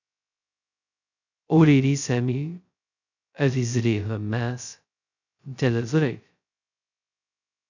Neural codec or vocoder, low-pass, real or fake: codec, 16 kHz, 0.2 kbps, FocalCodec; 7.2 kHz; fake